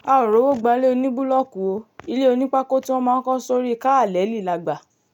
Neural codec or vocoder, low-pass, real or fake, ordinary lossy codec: none; 19.8 kHz; real; none